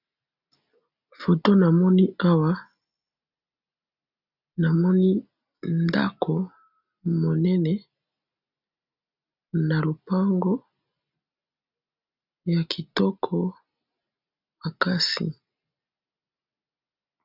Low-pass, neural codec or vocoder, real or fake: 5.4 kHz; none; real